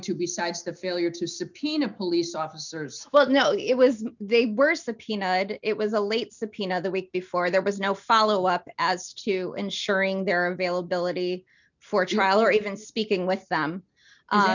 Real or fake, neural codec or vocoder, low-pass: real; none; 7.2 kHz